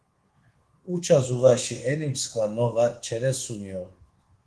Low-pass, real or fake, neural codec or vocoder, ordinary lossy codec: 10.8 kHz; fake; codec, 24 kHz, 1.2 kbps, DualCodec; Opus, 16 kbps